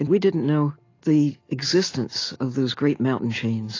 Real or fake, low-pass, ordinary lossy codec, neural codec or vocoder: real; 7.2 kHz; AAC, 32 kbps; none